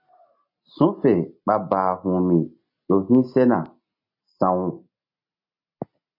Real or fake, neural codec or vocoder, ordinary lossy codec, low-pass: real; none; MP3, 32 kbps; 5.4 kHz